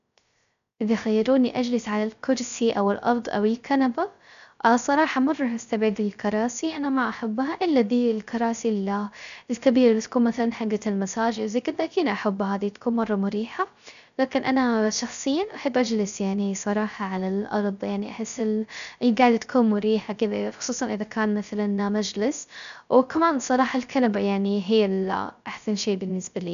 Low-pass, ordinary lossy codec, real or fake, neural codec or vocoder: 7.2 kHz; none; fake; codec, 16 kHz, 0.3 kbps, FocalCodec